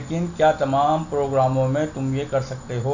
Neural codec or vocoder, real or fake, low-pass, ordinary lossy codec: none; real; 7.2 kHz; none